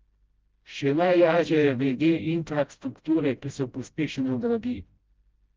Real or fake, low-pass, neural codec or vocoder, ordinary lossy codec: fake; 7.2 kHz; codec, 16 kHz, 0.5 kbps, FreqCodec, smaller model; Opus, 24 kbps